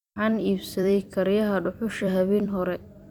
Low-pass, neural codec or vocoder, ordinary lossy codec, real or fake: 19.8 kHz; vocoder, 44.1 kHz, 128 mel bands every 256 samples, BigVGAN v2; none; fake